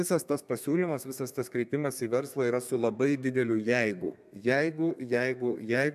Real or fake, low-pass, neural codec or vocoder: fake; 14.4 kHz; codec, 32 kHz, 1.9 kbps, SNAC